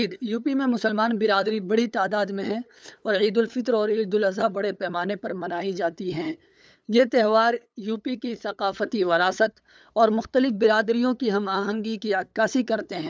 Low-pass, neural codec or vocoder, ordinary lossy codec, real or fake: none; codec, 16 kHz, 8 kbps, FunCodec, trained on LibriTTS, 25 frames a second; none; fake